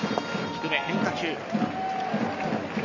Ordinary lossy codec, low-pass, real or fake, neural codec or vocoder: none; 7.2 kHz; real; none